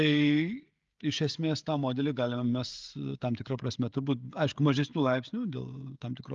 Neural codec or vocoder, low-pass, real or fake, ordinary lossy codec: codec, 16 kHz, 16 kbps, FreqCodec, smaller model; 7.2 kHz; fake; Opus, 32 kbps